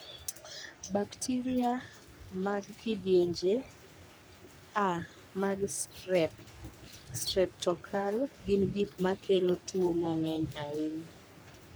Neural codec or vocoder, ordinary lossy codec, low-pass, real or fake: codec, 44.1 kHz, 3.4 kbps, Pupu-Codec; none; none; fake